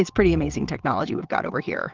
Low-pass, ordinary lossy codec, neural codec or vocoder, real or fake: 7.2 kHz; Opus, 16 kbps; vocoder, 22.05 kHz, 80 mel bands, WaveNeXt; fake